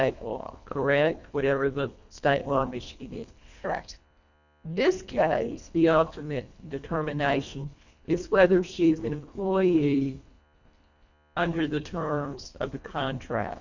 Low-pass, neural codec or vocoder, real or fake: 7.2 kHz; codec, 24 kHz, 1.5 kbps, HILCodec; fake